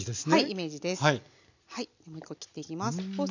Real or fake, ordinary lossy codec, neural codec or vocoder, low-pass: real; none; none; 7.2 kHz